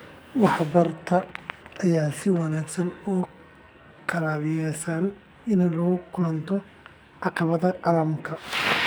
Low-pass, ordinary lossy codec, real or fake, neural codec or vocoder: none; none; fake; codec, 44.1 kHz, 2.6 kbps, SNAC